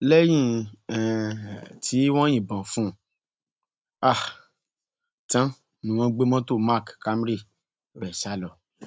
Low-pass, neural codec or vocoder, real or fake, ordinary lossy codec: none; none; real; none